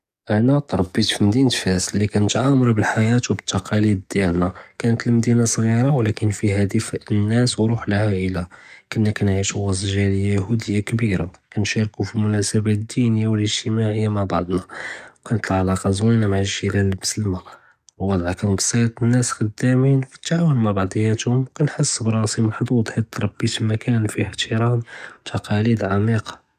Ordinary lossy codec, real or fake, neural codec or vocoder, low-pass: none; fake; codec, 44.1 kHz, 7.8 kbps, DAC; 14.4 kHz